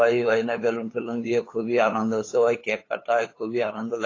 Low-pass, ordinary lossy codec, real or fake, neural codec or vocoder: 7.2 kHz; AAC, 32 kbps; fake; codec, 16 kHz, 8 kbps, FunCodec, trained on LibriTTS, 25 frames a second